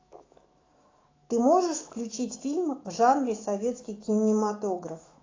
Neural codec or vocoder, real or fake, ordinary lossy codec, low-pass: autoencoder, 48 kHz, 128 numbers a frame, DAC-VAE, trained on Japanese speech; fake; AAC, 32 kbps; 7.2 kHz